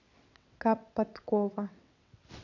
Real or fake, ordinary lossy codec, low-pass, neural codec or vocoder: fake; none; 7.2 kHz; vocoder, 22.05 kHz, 80 mel bands, WaveNeXt